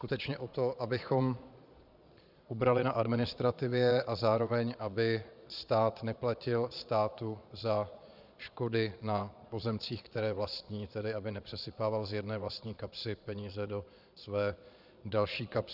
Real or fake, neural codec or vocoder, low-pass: fake; vocoder, 22.05 kHz, 80 mel bands, Vocos; 5.4 kHz